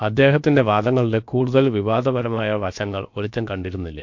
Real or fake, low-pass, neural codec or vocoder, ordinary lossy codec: fake; 7.2 kHz; codec, 16 kHz, about 1 kbps, DyCAST, with the encoder's durations; MP3, 48 kbps